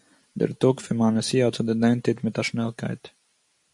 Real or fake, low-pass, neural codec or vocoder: real; 10.8 kHz; none